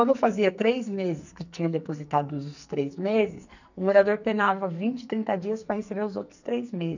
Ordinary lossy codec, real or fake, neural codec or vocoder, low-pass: none; fake; codec, 44.1 kHz, 2.6 kbps, SNAC; 7.2 kHz